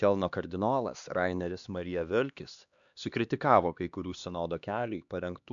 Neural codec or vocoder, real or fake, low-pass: codec, 16 kHz, 2 kbps, X-Codec, HuBERT features, trained on LibriSpeech; fake; 7.2 kHz